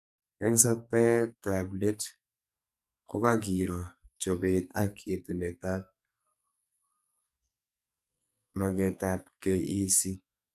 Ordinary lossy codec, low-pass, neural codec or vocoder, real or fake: none; 14.4 kHz; codec, 44.1 kHz, 2.6 kbps, SNAC; fake